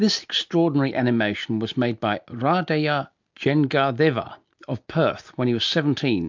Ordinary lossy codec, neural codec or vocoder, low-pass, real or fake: MP3, 64 kbps; none; 7.2 kHz; real